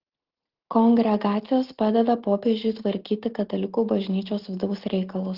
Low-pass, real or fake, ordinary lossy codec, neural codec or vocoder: 5.4 kHz; real; Opus, 16 kbps; none